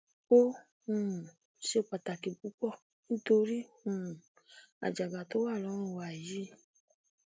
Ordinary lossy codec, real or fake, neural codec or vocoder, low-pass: none; real; none; none